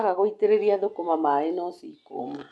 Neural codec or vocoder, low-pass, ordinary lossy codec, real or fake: none; none; none; real